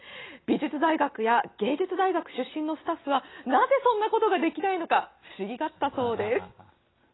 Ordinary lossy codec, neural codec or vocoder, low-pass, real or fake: AAC, 16 kbps; none; 7.2 kHz; real